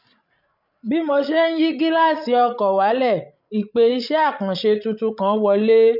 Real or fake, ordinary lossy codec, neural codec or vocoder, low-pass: fake; none; codec, 16 kHz, 16 kbps, FreqCodec, larger model; 5.4 kHz